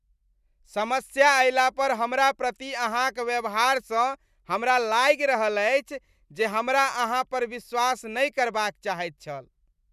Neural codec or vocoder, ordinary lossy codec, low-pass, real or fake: none; none; 14.4 kHz; real